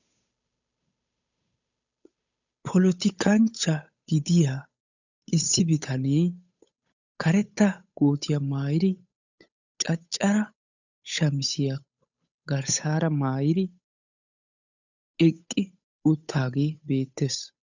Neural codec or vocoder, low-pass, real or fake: codec, 16 kHz, 8 kbps, FunCodec, trained on Chinese and English, 25 frames a second; 7.2 kHz; fake